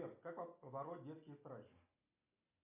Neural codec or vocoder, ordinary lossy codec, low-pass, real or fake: none; MP3, 32 kbps; 3.6 kHz; real